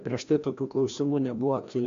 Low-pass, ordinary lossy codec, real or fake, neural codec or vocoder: 7.2 kHz; AAC, 64 kbps; fake; codec, 16 kHz, 1 kbps, FreqCodec, larger model